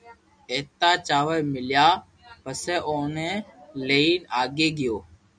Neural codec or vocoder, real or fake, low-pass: none; real; 9.9 kHz